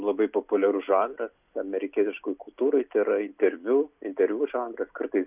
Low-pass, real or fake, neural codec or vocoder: 3.6 kHz; real; none